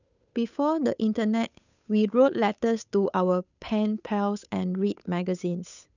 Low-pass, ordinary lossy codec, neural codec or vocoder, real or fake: 7.2 kHz; none; codec, 16 kHz, 8 kbps, FunCodec, trained on Chinese and English, 25 frames a second; fake